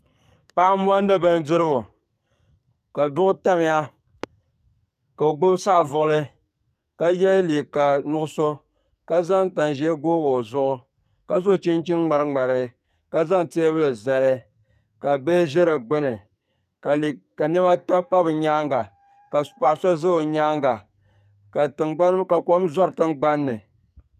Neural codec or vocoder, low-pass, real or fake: codec, 44.1 kHz, 2.6 kbps, SNAC; 14.4 kHz; fake